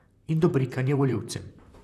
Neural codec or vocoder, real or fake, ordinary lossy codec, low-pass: vocoder, 44.1 kHz, 128 mel bands, Pupu-Vocoder; fake; none; 14.4 kHz